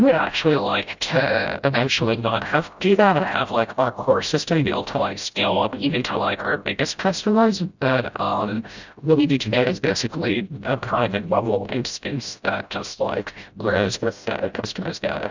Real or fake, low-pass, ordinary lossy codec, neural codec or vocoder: fake; 7.2 kHz; Opus, 64 kbps; codec, 16 kHz, 0.5 kbps, FreqCodec, smaller model